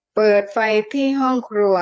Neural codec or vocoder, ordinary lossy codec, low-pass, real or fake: codec, 16 kHz, 4 kbps, FreqCodec, larger model; none; none; fake